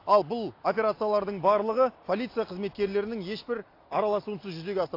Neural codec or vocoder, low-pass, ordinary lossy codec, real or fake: none; 5.4 kHz; AAC, 32 kbps; real